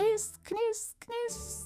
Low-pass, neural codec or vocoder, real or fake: 14.4 kHz; autoencoder, 48 kHz, 128 numbers a frame, DAC-VAE, trained on Japanese speech; fake